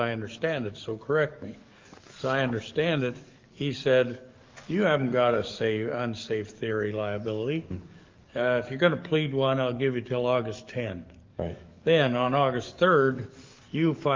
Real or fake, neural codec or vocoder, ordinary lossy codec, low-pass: fake; codec, 44.1 kHz, 7.8 kbps, DAC; Opus, 24 kbps; 7.2 kHz